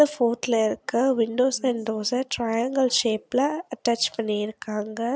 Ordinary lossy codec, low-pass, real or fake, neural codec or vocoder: none; none; real; none